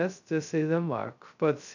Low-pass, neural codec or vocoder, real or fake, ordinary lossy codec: 7.2 kHz; codec, 16 kHz, 0.2 kbps, FocalCodec; fake; none